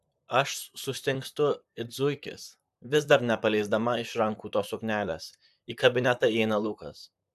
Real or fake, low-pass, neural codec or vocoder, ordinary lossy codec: fake; 14.4 kHz; vocoder, 44.1 kHz, 128 mel bands, Pupu-Vocoder; AAC, 96 kbps